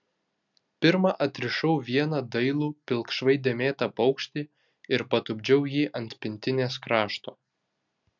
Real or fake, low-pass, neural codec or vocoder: real; 7.2 kHz; none